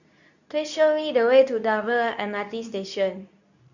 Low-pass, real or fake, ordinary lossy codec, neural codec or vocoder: 7.2 kHz; fake; none; codec, 24 kHz, 0.9 kbps, WavTokenizer, medium speech release version 2